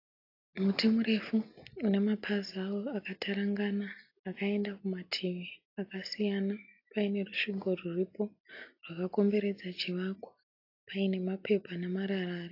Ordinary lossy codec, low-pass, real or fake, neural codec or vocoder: AAC, 32 kbps; 5.4 kHz; real; none